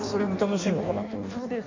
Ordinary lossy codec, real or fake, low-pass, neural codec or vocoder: MP3, 48 kbps; fake; 7.2 kHz; codec, 16 kHz in and 24 kHz out, 1.1 kbps, FireRedTTS-2 codec